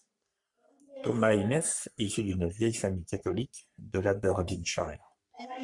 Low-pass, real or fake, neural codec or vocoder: 10.8 kHz; fake; codec, 44.1 kHz, 3.4 kbps, Pupu-Codec